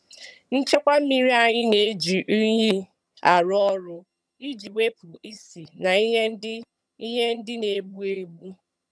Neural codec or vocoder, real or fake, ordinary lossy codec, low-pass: vocoder, 22.05 kHz, 80 mel bands, HiFi-GAN; fake; none; none